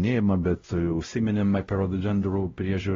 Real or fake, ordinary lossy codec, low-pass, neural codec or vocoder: fake; AAC, 24 kbps; 7.2 kHz; codec, 16 kHz, 0.5 kbps, X-Codec, WavLM features, trained on Multilingual LibriSpeech